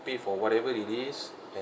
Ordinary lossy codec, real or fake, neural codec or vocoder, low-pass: none; real; none; none